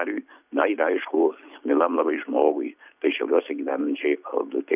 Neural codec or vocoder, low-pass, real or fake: none; 3.6 kHz; real